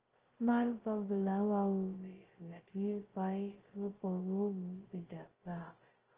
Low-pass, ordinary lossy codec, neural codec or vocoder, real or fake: 3.6 kHz; Opus, 16 kbps; codec, 16 kHz, 0.2 kbps, FocalCodec; fake